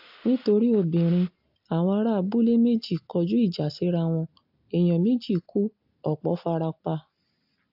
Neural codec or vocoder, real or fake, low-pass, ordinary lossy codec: none; real; 5.4 kHz; AAC, 48 kbps